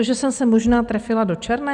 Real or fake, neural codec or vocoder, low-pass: real; none; 10.8 kHz